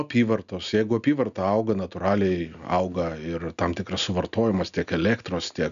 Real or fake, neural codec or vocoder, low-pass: real; none; 7.2 kHz